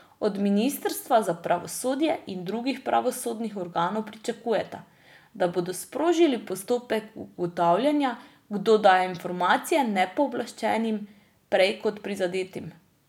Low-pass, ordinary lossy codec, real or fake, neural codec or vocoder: 19.8 kHz; none; real; none